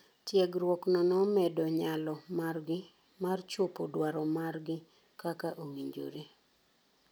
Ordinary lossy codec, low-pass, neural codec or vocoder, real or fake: none; none; none; real